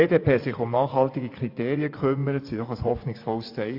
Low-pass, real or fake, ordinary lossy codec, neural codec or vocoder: 5.4 kHz; fake; AAC, 32 kbps; vocoder, 24 kHz, 100 mel bands, Vocos